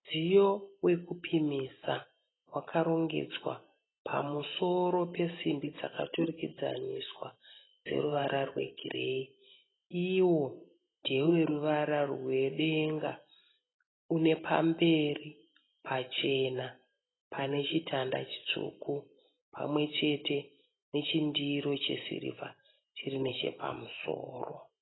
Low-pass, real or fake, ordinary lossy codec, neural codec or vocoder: 7.2 kHz; real; AAC, 16 kbps; none